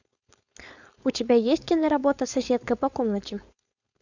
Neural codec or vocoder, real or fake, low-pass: codec, 16 kHz, 4.8 kbps, FACodec; fake; 7.2 kHz